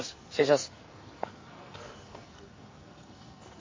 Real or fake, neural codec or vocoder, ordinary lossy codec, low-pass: fake; codec, 44.1 kHz, 2.6 kbps, SNAC; MP3, 32 kbps; 7.2 kHz